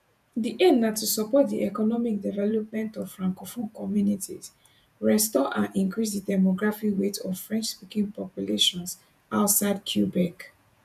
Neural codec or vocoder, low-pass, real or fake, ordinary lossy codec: vocoder, 48 kHz, 128 mel bands, Vocos; 14.4 kHz; fake; none